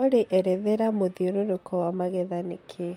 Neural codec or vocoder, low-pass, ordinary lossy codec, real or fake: vocoder, 44.1 kHz, 128 mel bands, Pupu-Vocoder; 19.8 kHz; MP3, 64 kbps; fake